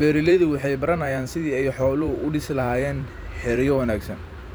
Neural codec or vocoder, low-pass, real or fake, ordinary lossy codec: vocoder, 44.1 kHz, 128 mel bands every 512 samples, BigVGAN v2; none; fake; none